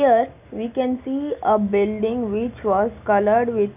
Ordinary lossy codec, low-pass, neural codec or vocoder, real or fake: none; 3.6 kHz; none; real